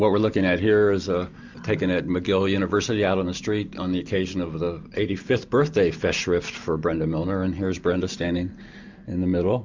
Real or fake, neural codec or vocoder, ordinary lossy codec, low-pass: real; none; MP3, 64 kbps; 7.2 kHz